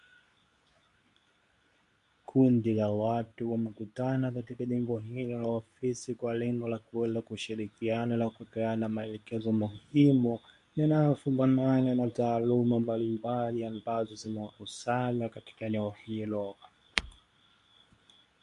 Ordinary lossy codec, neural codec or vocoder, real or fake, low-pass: MP3, 64 kbps; codec, 24 kHz, 0.9 kbps, WavTokenizer, medium speech release version 2; fake; 10.8 kHz